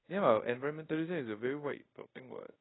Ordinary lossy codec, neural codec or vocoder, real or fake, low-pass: AAC, 16 kbps; codec, 24 kHz, 0.5 kbps, DualCodec; fake; 7.2 kHz